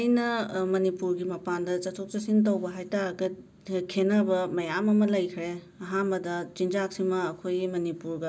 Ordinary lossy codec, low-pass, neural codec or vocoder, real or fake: none; none; none; real